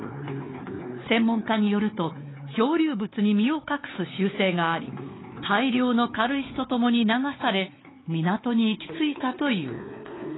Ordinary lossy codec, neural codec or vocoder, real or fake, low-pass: AAC, 16 kbps; codec, 16 kHz, 4 kbps, X-Codec, WavLM features, trained on Multilingual LibriSpeech; fake; 7.2 kHz